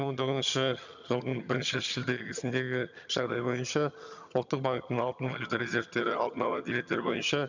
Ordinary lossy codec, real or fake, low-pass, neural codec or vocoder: none; fake; 7.2 kHz; vocoder, 22.05 kHz, 80 mel bands, HiFi-GAN